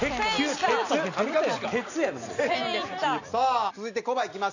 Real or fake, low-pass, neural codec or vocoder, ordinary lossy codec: real; 7.2 kHz; none; none